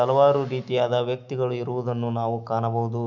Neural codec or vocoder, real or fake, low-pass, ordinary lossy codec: autoencoder, 48 kHz, 128 numbers a frame, DAC-VAE, trained on Japanese speech; fake; 7.2 kHz; none